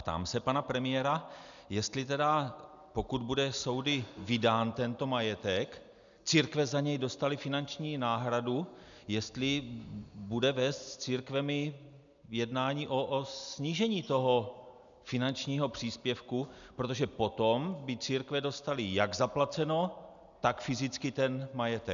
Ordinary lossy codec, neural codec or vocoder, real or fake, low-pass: MP3, 96 kbps; none; real; 7.2 kHz